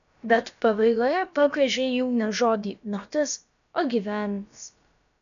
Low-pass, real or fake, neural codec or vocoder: 7.2 kHz; fake; codec, 16 kHz, about 1 kbps, DyCAST, with the encoder's durations